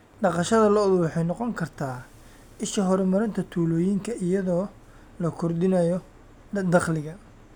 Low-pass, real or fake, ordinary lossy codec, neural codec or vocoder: 19.8 kHz; real; none; none